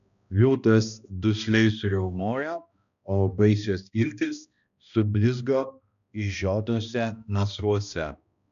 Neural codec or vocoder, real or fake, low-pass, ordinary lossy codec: codec, 16 kHz, 1 kbps, X-Codec, HuBERT features, trained on balanced general audio; fake; 7.2 kHz; MP3, 96 kbps